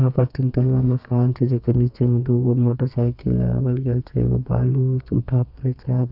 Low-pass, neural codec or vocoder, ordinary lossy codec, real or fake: 5.4 kHz; codec, 32 kHz, 1.9 kbps, SNAC; none; fake